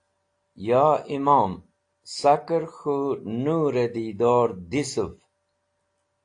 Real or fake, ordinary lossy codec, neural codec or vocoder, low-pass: real; AAC, 48 kbps; none; 9.9 kHz